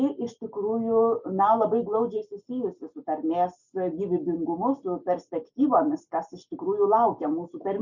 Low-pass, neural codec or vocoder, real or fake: 7.2 kHz; none; real